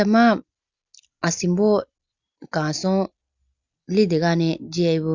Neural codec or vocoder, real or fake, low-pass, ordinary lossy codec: none; real; 7.2 kHz; Opus, 64 kbps